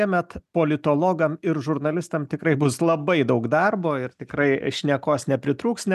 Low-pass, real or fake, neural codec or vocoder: 14.4 kHz; real; none